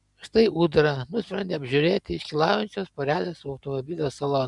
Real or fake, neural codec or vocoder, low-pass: real; none; 10.8 kHz